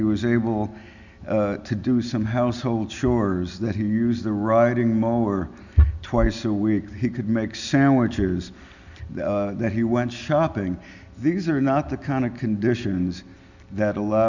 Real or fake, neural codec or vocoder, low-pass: real; none; 7.2 kHz